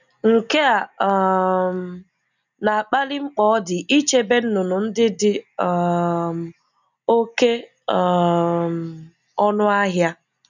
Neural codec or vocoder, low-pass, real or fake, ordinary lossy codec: none; 7.2 kHz; real; none